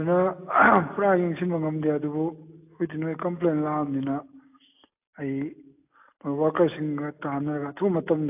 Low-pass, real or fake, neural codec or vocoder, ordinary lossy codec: 3.6 kHz; real; none; AAC, 32 kbps